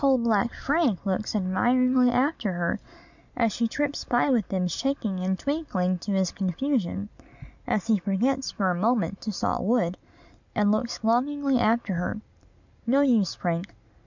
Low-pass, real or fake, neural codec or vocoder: 7.2 kHz; real; none